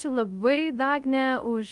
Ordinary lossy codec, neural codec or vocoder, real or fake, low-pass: Opus, 32 kbps; codec, 24 kHz, 0.5 kbps, DualCodec; fake; 10.8 kHz